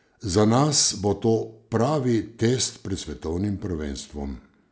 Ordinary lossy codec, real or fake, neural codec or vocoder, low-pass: none; real; none; none